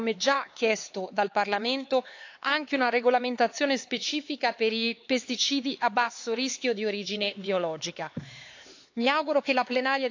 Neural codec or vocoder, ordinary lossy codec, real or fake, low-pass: codec, 16 kHz, 4 kbps, X-Codec, HuBERT features, trained on LibriSpeech; AAC, 48 kbps; fake; 7.2 kHz